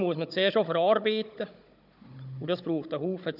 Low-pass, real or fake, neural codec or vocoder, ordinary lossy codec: 5.4 kHz; fake; codec, 16 kHz, 16 kbps, FunCodec, trained on Chinese and English, 50 frames a second; none